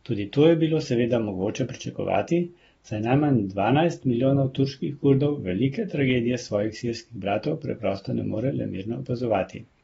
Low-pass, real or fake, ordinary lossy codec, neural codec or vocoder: 19.8 kHz; real; AAC, 24 kbps; none